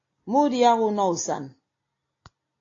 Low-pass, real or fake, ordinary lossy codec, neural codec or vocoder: 7.2 kHz; real; AAC, 32 kbps; none